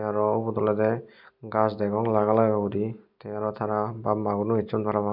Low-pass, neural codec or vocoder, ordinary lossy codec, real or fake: 5.4 kHz; none; none; real